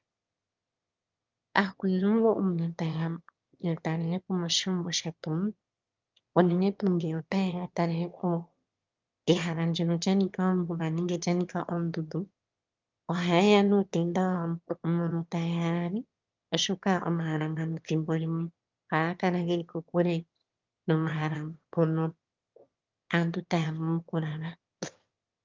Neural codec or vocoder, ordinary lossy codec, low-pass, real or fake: autoencoder, 22.05 kHz, a latent of 192 numbers a frame, VITS, trained on one speaker; Opus, 24 kbps; 7.2 kHz; fake